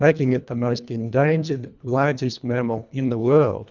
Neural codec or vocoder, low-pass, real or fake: codec, 24 kHz, 1.5 kbps, HILCodec; 7.2 kHz; fake